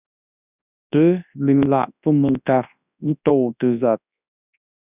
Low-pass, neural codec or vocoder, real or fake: 3.6 kHz; codec, 24 kHz, 0.9 kbps, WavTokenizer, large speech release; fake